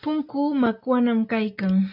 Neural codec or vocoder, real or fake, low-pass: none; real; 5.4 kHz